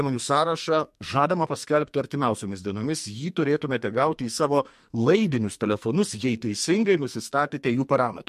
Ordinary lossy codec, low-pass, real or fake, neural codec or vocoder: MP3, 64 kbps; 14.4 kHz; fake; codec, 44.1 kHz, 2.6 kbps, SNAC